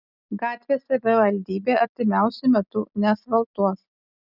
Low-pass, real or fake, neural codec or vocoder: 5.4 kHz; real; none